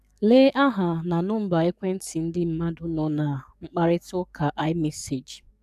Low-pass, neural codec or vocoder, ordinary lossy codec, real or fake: 14.4 kHz; codec, 44.1 kHz, 7.8 kbps, DAC; none; fake